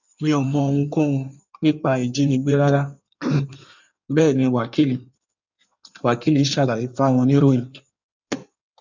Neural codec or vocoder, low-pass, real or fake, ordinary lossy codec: codec, 16 kHz in and 24 kHz out, 1.1 kbps, FireRedTTS-2 codec; 7.2 kHz; fake; none